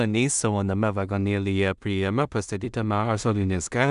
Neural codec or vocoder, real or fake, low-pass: codec, 16 kHz in and 24 kHz out, 0.4 kbps, LongCat-Audio-Codec, two codebook decoder; fake; 10.8 kHz